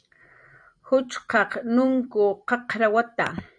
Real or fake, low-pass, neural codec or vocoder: fake; 9.9 kHz; vocoder, 44.1 kHz, 128 mel bands every 256 samples, BigVGAN v2